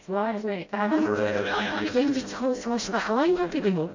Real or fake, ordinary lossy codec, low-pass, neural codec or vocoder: fake; MP3, 48 kbps; 7.2 kHz; codec, 16 kHz, 0.5 kbps, FreqCodec, smaller model